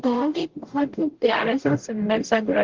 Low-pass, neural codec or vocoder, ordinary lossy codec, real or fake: 7.2 kHz; codec, 44.1 kHz, 0.9 kbps, DAC; Opus, 16 kbps; fake